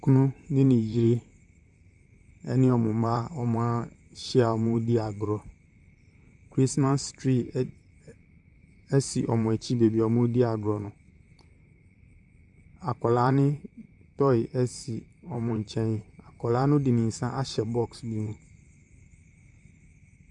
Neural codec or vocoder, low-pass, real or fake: vocoder, 44.1 kHz, 128 mel bands, Pupu-Vocoder; 10.8 kHz; fake